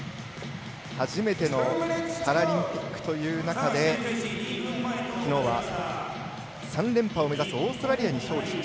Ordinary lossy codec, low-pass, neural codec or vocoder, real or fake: none; none; none; real